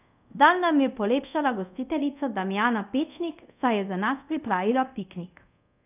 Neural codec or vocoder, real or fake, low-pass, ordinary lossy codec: codec, 24 kHz, 0.5 kbps, DualCodec; fake; 3.6 kHz; none